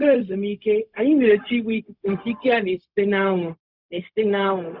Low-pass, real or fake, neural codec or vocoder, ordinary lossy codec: 5.4 kHz; fake; codec, 16 kHz, 0.4 kbps, LongCat-Audio-Codec; Opus, 64 kbps